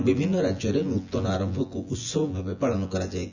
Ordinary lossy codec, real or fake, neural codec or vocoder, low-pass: none; fake; vocoder, 24 kHz, 100 mel bands, Vocos; 7.2 kHz